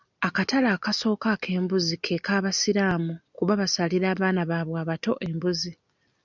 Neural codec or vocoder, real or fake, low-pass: none; real; 7.2 kHz